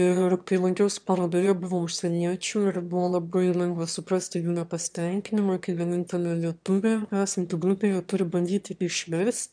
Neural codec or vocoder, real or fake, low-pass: autoencoder, 22.05 kHz, a latent of 192 numbers a frame, VITS, trained on one speaker; fake; 9.9 kHz